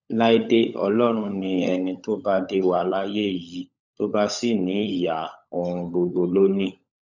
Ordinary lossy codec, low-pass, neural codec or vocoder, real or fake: none; 7.2 kHz; codec, 16 kHz, 16 kbps, FunCodec, trained on LibriTTS, 50 frames a second; fake